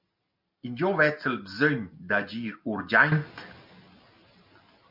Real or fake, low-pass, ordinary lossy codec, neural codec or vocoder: real; 5.4 kHz; MP3, 48 kbps; none